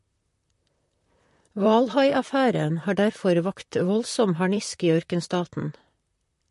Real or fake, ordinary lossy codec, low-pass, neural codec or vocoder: fake; MP3, 48 kbps; 14.4 kHz; vocoder, 44.1 kHz, 128 mel bands, Pupu-Vocoder